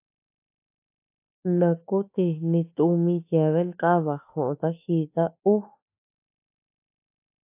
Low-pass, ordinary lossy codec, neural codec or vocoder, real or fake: 3.6 kHz; AAC, 24 kbps; autoencoder, 48 kHz, 32 numbers a frame, DAC-VAE, trained on Japanese speech; fake